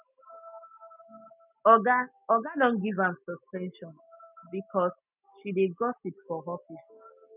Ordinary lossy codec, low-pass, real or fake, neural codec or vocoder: none; 3.6 kHz; real; none